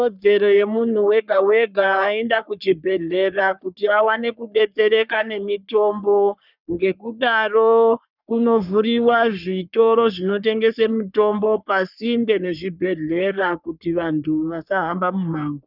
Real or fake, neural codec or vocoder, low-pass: fake; codec, 44.1 kHz, 3.4 kbps, Pupu-Codec; 5.4 kHz